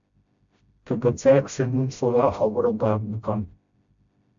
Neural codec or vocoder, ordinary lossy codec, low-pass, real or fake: codec, 16 kHz, 0.5 kbps, FreqCodec, smaller model; MP3, 64 kbps; 7.2 kHz; fake